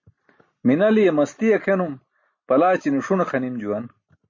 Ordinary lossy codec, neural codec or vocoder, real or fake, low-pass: MP3, 32 kbps; none; real; 7.2 kHz